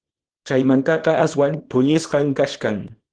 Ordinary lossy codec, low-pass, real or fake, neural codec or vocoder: Opus, 16 kbps; 9.9 kHz; fake; codec, 24 kHz, 0.9 kbps, WavTokenizer, small release